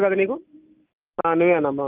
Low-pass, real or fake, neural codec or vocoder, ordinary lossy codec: 3.6 kHz; real; none; Opus, 24 kbps